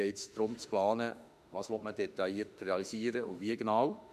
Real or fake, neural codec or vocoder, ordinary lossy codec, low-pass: fake; autoencoder, 48 kHz, 32 numbers a frame, DAC-VAE, trained on Japanese speech; none; 14.4 kHz